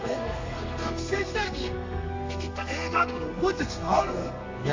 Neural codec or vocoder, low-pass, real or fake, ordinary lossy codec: codec, 32 kHz, 1.9 kbps, SNAC; 7.2 kHz; fake; MP3, 48 kbps